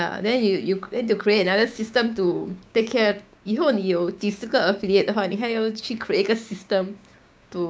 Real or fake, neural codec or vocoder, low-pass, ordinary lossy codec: fake; codec, 16 kHz, 6 kbps, DAC; none; none